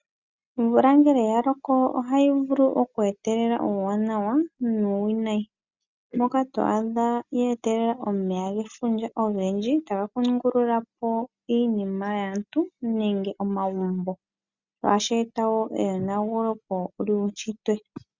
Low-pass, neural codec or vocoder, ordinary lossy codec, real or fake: 7.2 kHz; none; Opus, 64 kbps; real